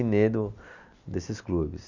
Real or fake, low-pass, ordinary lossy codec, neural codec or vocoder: real; 7.2 kHz; none; none